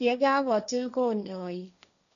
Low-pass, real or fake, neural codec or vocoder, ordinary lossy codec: 7.2 kHz; fake; codec, 16 kHz, 1.1 kbps, Voila-Tokenizer; none